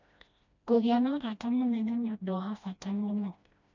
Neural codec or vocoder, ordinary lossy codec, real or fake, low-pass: codec, 16 kHz, 1 kbps, FreqCodec, smaller model; none; fake; 7.2 kHz